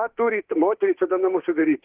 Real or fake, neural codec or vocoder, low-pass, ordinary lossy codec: fake; autoencoder, 48 kHz, 32 numbers a frame, DAC-VAE, trained on Japanese speech; 3.6 kHz; Opus, 16 kbps